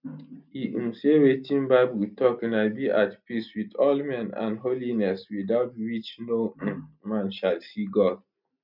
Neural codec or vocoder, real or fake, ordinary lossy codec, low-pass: none; real; none; 5.4 kHz